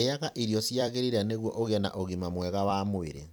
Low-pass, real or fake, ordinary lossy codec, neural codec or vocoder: none; fake; none; vocoder, 44.1 kHz, 128 mel bands every 256 samples, BigVGAN v2